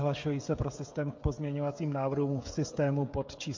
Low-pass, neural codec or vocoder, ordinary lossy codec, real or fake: 7.2 kHz; codec, 16 kHz, 16 kbps, FreqCodec, smaller model; MP3, 48 kbps; fake